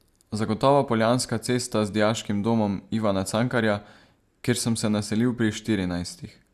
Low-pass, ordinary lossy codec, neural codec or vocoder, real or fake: 14.4 kHz; Opus, 64 kbps; none; real